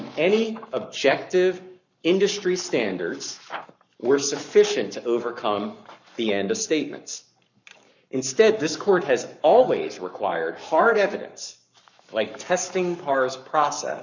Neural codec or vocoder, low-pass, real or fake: codec, 44.1 kHz, 7.8 kbps, Pupu-Codec; 7.2 kHz; fake